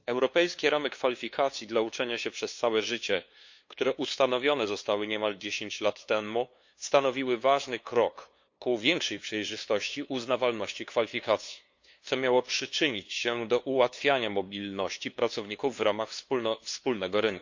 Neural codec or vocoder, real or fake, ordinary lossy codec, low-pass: codec, 16 kHz, 2 kbps, FunCodec, trained on LibriTTS, 25 frames a second; fake; MP3, 48 kbps; 7.2 kHz